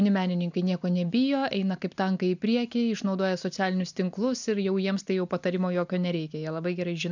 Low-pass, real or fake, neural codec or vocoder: 7.2 kHz; real; none